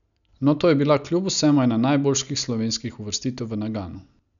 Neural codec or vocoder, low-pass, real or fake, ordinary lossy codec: none; 7.2 kHz; real; none